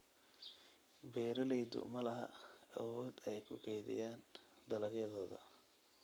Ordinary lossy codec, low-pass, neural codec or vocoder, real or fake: none; none; codec, 44.1 kHz, 7.8 kbps, Pupu-Codec; fake